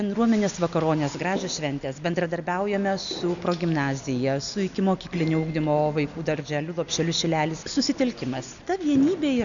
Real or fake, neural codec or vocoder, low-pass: real; none; 7.2 kHz